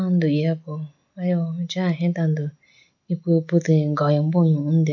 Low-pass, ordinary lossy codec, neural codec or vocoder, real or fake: 7.2 kHz; none; none; real